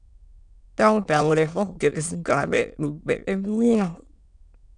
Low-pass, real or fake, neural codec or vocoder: 9.9 kHz; fake; autoencoder, 22.05 kHz, a latent of 192 numbers a frame, VITS, trained on many speakers